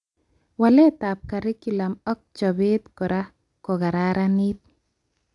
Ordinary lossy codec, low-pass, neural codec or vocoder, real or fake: AAC, 64 kbps; 10.8 kHz; none; real